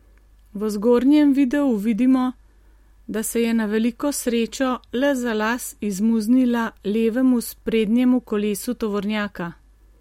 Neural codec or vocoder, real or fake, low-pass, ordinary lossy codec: none; real; 19.8 kHz; MP3, 64 kbps